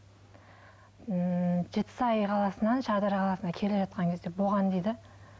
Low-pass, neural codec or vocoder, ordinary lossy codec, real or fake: none; none; none; real